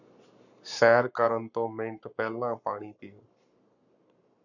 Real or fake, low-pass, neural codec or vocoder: fake; 7.2 kHz; codec, 44.1 kHz, 7.8 kbps, Pupu-Codec